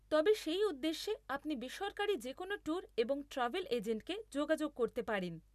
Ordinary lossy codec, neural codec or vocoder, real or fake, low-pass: none; none; real; 14.4 kHz